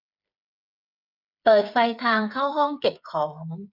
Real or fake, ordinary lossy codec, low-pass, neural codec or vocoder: fake; none; 5.4 kHz; codec, 16 kHz, 8 kbps, FreqCodec, smaller model